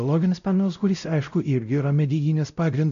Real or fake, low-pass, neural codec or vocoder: fake; 7.2 kHz; codec, 16 kHz, 0.5 kbps, X-Codec, WavLM features, trained on Multilingual LibriSpeech